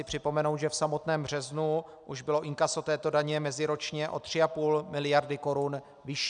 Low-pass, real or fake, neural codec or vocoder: 9.9 kHz; real; none